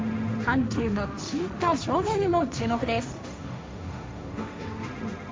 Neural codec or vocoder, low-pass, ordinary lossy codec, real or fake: codec, 16 kHz, 1.1 kbps, Voila-Tokenizer; none; none; fake